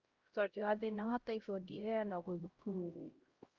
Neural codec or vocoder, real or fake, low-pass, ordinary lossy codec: codec, 16 kHz, 0.5 kbps, X-Codec, HuBERT features, trained on LibriSpeech; fake; 7.2 kHz; Opus, 32 kbps